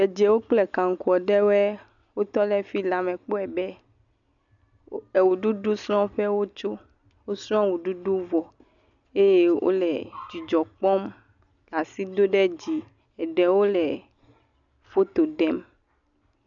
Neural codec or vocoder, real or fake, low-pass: none; real; 7.2 kHz